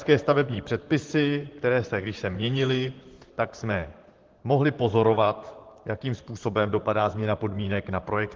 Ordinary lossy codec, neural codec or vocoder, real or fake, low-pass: Opus, 16 kbps; vocoder, 44.1 kHz, 128 mel bands, Pupu-Vocoder; fake; 7.2 kHz